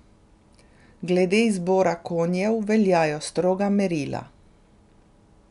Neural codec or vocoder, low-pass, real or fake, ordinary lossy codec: none; 10.8 kHz; real; none